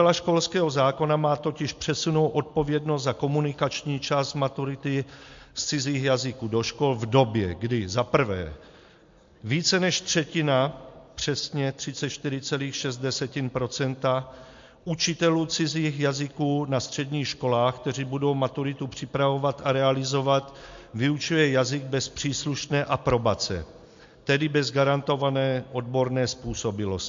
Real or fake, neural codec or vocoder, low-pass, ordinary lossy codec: real; none; 7.2 kHz; MP3, 48 kbps